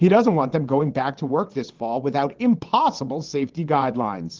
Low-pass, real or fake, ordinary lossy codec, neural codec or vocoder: 7.2 kHz; fake; Opus, 16 kbps; vocoder, 22.05 kHz, 80 mel bands, WaveNeXt